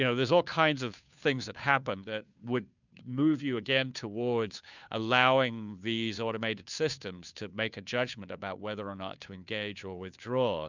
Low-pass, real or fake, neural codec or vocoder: 7.2 kHz; fake; codec, 16 kHz, 2 kbps, FunCodec, trained on Chinese and English, 25 frames a second